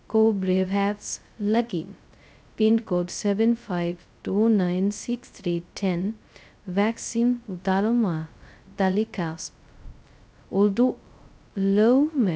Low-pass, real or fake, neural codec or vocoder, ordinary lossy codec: none; fake; codec, 16 kHz, 0.2 kbps, FocalCodec; none